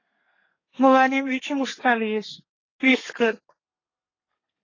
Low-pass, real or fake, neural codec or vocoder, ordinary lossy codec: 7.2 kHz; fake; codec, 32 kHz, 1.9 kbps, SNAC; AAC, 32 kbps